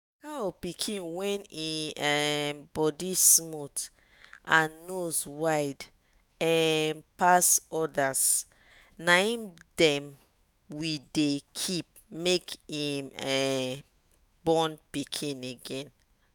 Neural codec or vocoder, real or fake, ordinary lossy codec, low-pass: autoencoder, 48 kHz, 128 numbers a frame, DAC-VAE, trained on Japanese speech; fake; none; none